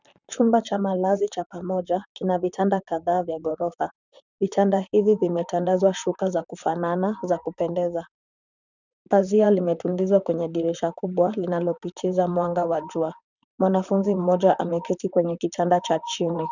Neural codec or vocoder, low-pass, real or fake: vocoder, 44.1 kHz, 128 mel bands, Pupu-Vocoder; 7.2 kHz; fake